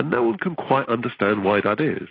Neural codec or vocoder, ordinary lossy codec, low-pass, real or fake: none; AAC, 24 kbps; 5.4 kHz; real